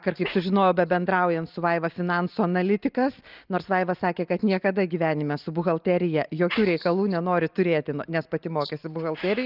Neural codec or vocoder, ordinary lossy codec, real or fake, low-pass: none; Opus, 24 kbps; real; 5.4 kHz